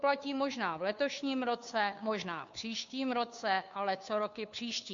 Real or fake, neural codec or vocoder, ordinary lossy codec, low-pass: fake; codec, 16 kHz, 2 kbps, FunCodec, trained on Chinese and English, 25 frames a second; MP3, 64 kbps; 7.2 kHz